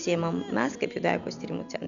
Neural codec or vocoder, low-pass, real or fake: none; 7.2 kHz; real